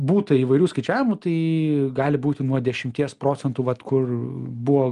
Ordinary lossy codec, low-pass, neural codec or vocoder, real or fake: Opus, 32 kbps; 10.8 kHz; none; real